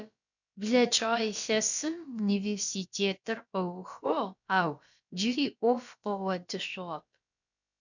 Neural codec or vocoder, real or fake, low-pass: codec, 16 kHz, about 1 kbps, DyCAST, with the encoder's durations; fake; 7.2 kHz